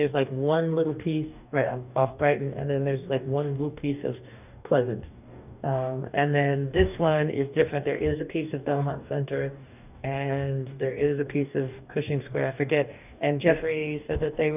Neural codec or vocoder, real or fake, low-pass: codec, 44.1 kHz, 2.6 kbps, DAC; fake; 3.6 kHz